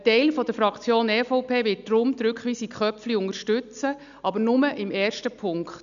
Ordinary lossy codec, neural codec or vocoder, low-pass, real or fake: none; none; 7.2 kHz; real